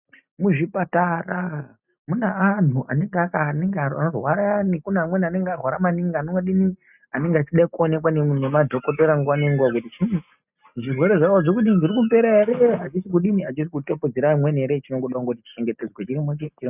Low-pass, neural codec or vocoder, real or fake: 3.6 kHz; none; real